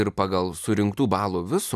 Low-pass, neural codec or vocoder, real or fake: 14.4 kHz; none; real